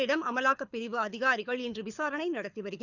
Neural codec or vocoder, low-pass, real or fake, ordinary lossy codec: codec, 24 kHz, 6 kbps, HILCodec; 7.2 kHz; fake; none